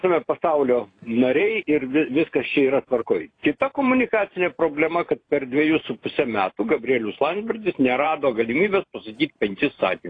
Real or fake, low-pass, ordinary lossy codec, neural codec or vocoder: fake; 9.9 kHz; AAC, 32 kbps; vocoder, 44.1 kHz, 128 mel bands every 512 samples, BigVGAN v2